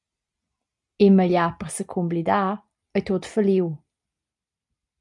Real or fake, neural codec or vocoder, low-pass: real; none; 10.8 kHz